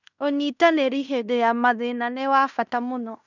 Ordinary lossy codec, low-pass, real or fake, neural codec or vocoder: none; 7.2 kHz; fake; codec, 16 kHz in and 24 kHz out, 0.9 kbps, LongCat-Audio-Codec, fine tuned four codebook decoder